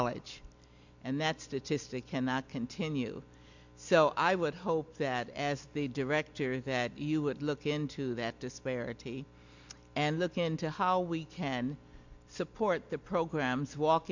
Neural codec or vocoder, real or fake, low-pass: none; real; 7.2 kHz